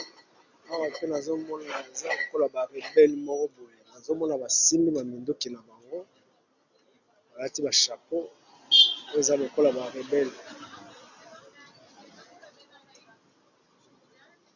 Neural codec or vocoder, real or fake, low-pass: none; real; 7.2 kHz